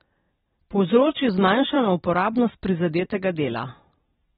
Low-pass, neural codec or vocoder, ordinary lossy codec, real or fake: 19.8 kHz; vocoder, 44.1 kHz, 128 mel bands every 256 samples, BigVGAN v2; AAC, 16 kbps; fake